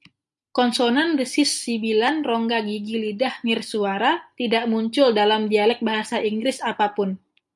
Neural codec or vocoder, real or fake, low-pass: none; real; 10.8 kHz